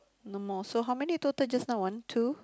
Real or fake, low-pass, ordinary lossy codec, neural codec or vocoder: real; none; none; none